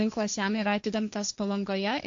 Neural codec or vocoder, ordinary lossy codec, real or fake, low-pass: codec, 16 kHz, 1.1 kbps, Voila-Tokenizer; MP3, 48 kbps; fake; 7.2 kHz